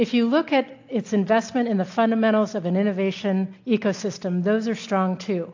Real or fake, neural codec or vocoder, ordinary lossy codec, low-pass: real; none; AAC, 48 kbps; 7.2 kHz